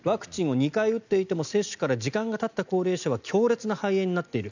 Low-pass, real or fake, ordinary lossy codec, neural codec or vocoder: 7.2 kHz; real; none; none